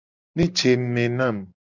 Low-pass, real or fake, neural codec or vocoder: 7.2 kHz; real; none